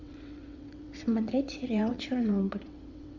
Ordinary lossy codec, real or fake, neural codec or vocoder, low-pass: MP3, 64 kbps; fake; vocoder, 22.05 kHz, 80 mel bands, WaveNeXt; 7.2 kHz